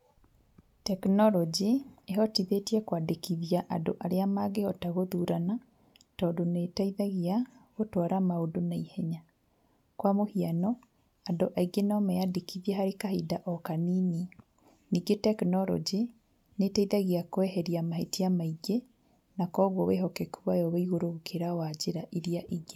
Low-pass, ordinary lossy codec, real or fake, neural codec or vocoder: 19.8 kHz; none; real; none